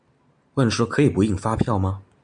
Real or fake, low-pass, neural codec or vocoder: real; 9.9 kHz; none